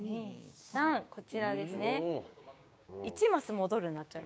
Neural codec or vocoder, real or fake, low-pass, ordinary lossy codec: codec, 16 kHz, 6 kbps, DAC; fake; none; none